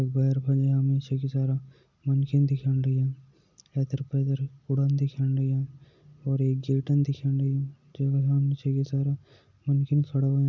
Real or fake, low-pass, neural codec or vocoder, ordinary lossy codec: real; 7.2 kHz; none; none